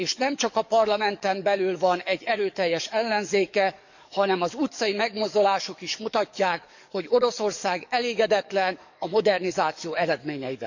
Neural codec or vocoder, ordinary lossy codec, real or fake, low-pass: codec, 44.1 kHz, 7.8 kbps, DAC; none; fake; 7.2 kHz